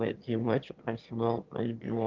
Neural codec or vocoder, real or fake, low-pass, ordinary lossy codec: autoencoder, 22.05 kHz, a latent of 192 numbers a frame, VITS, trained on one speaker; fake; 7.2 kHz; Opus, 32 kbps